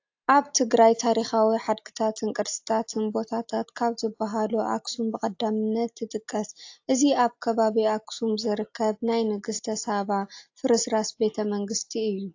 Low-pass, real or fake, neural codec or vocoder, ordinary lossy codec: 7.2 kHz; real; none; AAC, 48 kbps